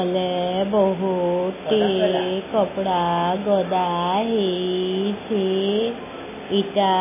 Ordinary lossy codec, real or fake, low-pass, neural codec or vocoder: MP3, 16 kbps; real; 3.6 kHz; none